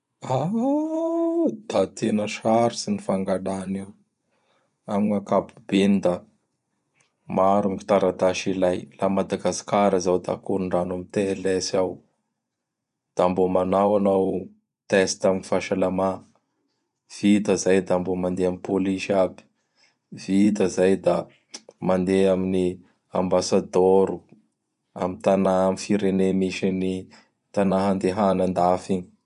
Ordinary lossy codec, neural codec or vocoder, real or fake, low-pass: none; vocoder, 24 kHz, 100 mel bands, Vocos; fake; 10.8 kHz